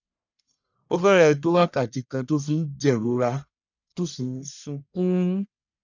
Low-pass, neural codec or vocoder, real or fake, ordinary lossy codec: 7.2 kHz; codec, 44.1 kHz, 1.7 kbps, Pupu-Codec; fake; none